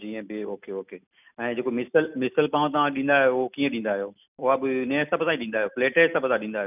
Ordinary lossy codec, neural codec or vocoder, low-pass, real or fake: none; none; 3.6 kHz; real